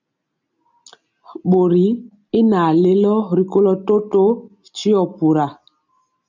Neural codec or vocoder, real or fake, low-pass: none; real; 7.2 kHz